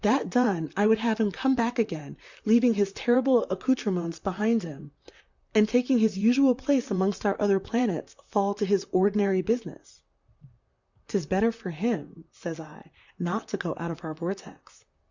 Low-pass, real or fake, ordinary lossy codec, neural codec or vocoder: 7.2 kHz; fake; Opus, 64 kbps; vocoder, 44.1 kHz, 128 mel bands, Pupu-Vocoder